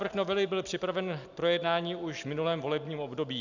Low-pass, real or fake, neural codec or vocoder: 7.2 kHz; real; none